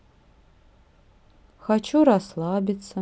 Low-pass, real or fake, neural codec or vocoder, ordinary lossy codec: none; real; none; none